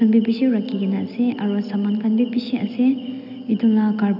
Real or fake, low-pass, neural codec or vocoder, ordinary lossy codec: real; 5.4 kHz; none; none